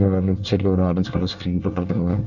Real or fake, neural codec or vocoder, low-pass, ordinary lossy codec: fake; codec, 24 kHz, 1 kbps, SNAC; 7.2 kHz; none